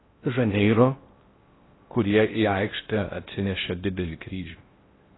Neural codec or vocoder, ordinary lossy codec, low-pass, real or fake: codec, 16 kHz in and 24 kHz out, 0.6 kbps, FocalCodec, streaming, 4096 codes; AAC, 16 kbps; 7.2 kHz; fake